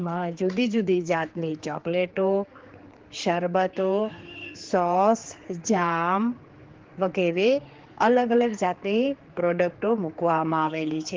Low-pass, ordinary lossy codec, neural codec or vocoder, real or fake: 7.2 kHz; Opus, 16 kbps; codec, 16 kHz, 4 kbps, X-Codec, HuBERT features, trained on general audio; fake